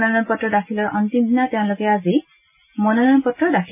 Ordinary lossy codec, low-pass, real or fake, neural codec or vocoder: none; 3.6 kHz; real; none